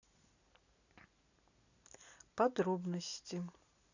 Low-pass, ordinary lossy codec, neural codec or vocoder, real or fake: 7.2 kHz; none; none; real